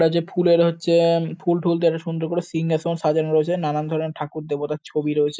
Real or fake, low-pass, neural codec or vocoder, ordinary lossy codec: real; none; none; none